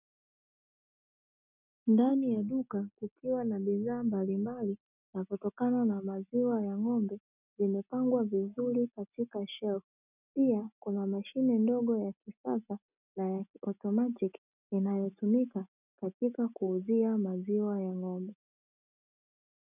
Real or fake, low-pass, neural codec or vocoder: real; 3.6 kHz; none